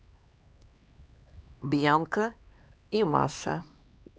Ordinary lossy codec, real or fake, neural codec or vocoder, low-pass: none; fake; codec, 16 kHz, 2 kbps, X-Codec, HuBERT features, trained on LibriSpeech; none